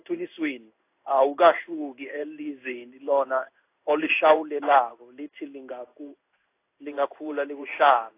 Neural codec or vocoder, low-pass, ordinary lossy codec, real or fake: codec, 16 kHz in and 24 kHz out, 1 kbps, XY-Tokenizer; 3.6 kHz; AAC, 24 kbps; fake